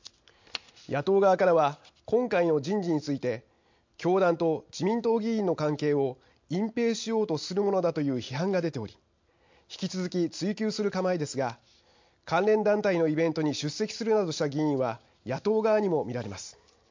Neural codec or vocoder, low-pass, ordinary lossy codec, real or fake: none; 7.2 kHz; MP3, 48 kbps; real